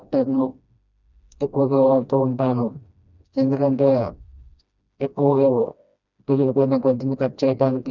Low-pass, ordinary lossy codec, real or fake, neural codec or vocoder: 7.2 kHz; none; fake; codec, 16 kHz, 1 kbps, FreqCodec, smaller model